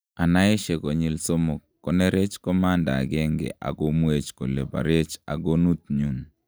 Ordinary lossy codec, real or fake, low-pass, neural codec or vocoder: none; real; none; none